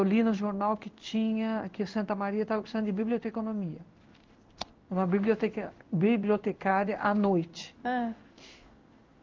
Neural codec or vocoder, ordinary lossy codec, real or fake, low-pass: codec, 16 kHz in and 24 kHz out, 1 kbps, XY-Tokenizer; Opus, 24 kbps; fake; 7.2 kHz